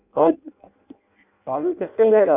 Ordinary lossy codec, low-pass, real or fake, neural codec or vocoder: none; 3.6 kHz; fake; codec, 16 kHz in and 24 kHz out, 0.6 kbps, FireRedTTS-2 codec